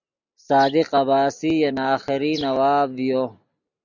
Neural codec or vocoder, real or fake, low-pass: none; real; 7.2 kHz